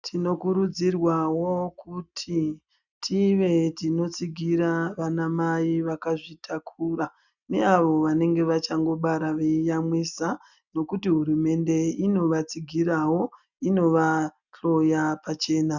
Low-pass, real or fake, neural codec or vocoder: 7.2 kHz; real; none